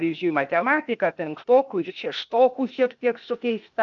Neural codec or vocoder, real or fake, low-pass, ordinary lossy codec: codec, 16 kHz, 0.8 kbps, ZipCodec; fake; 7.2 kHz; MP3, 64 kbps